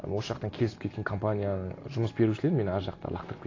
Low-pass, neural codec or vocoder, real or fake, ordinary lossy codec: 7.2 kHz; none; real; AAC, 32 kbps